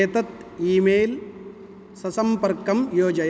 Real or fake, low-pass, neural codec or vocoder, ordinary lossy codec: real; none; none; none